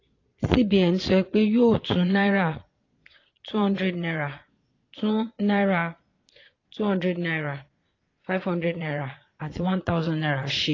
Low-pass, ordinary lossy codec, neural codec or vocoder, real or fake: 7.2 kHz; AAC, 32 kbps; vocoder, 22.05 kHz, 80 mel bands, Vocos; fake